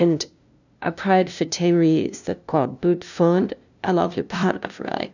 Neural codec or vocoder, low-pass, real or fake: codec, 16 kHz, 0.5 kbps, FunCodec, trained on LibriTTS, 25 frames a second; 7.2 kHz; fake